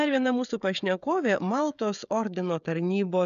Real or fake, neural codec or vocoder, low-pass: fake; codec, 16 kHz, 16 kbps, FreqCodec, smaller model; 7.2 kHz